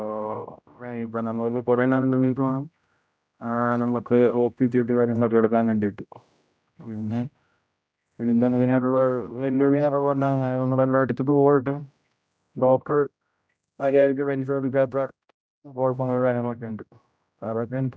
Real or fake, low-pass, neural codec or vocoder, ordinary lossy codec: fake; none; codec, 16 kHz, 0.5 kbps, X-Codec, HuBERT features, trained on general audio; none